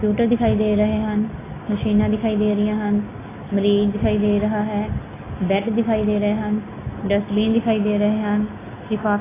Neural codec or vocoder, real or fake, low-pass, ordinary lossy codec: none; real; 3.6 kHz; AAC, 16 kbps